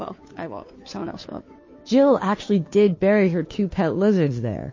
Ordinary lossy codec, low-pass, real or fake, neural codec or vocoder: MP3, 32 kbps; 7.2 kHz; fake; codec, 16 kHz, 2 kbps, FunCodec, trained on Chinese and English, 25 frames a second